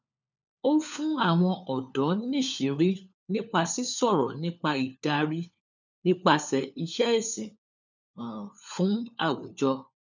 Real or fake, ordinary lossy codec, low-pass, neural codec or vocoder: fake; none; 7.2 kHz; codec, 16 kHz, 4 kbps, FunCodec, trained on LibriTTS, 50 frames a second